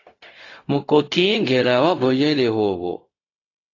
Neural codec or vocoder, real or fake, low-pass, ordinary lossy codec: codec, 16 kHz, 0.4 kbps, LongCat-Audio-Codec; fake; 7.2 kHz; AAC, 32 kbps